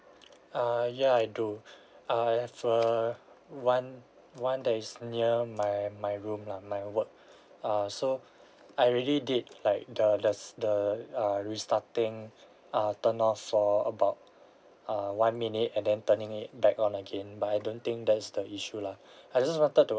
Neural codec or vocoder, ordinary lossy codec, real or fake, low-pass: none; none; real; none